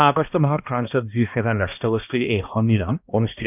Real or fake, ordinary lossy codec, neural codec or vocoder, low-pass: fake; none; codec, 16 kHz, 1 kbps, X-Codec, HuBERT features, trained on balanced general audio; 3.6 kHz